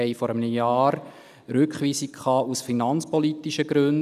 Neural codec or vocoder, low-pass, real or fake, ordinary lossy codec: none; 14.4 kHz; real; none